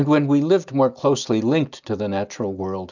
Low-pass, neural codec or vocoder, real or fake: 7.2 kHz; none; real